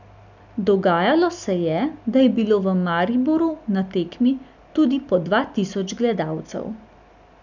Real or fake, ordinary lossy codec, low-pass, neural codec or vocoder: real; none; 7.2 kHz; none